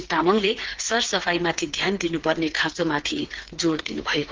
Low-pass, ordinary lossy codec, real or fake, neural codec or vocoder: 7.2 kHz; Opus, 16 kbps; fake; codec, 16 kHz, 4 kbps, FreqCodec, smaller model